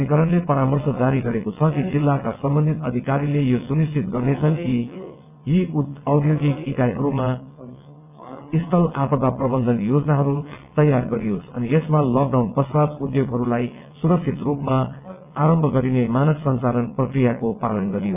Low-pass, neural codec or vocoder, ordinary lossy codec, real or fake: 3.6 kHz; vocoder, 22.05 kHz, 80 mel bands, WaveNeXt; none; fake